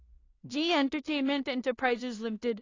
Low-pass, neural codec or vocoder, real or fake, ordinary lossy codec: 7.2 kHz; codec, 16 kHz in and 24 kHz out, 0.4 kbps, LongCat-Audio-Codec, two codebook decoder; fake; AAC, 32 kbps